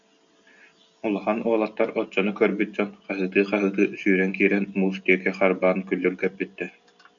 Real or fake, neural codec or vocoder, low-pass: real; none; 7.2 kHz